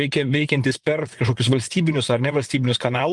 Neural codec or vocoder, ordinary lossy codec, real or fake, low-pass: vocoder, 24 kHz, 100 mel bands, Vocos; Opus, 32 kbps; fake; 10.8 kHz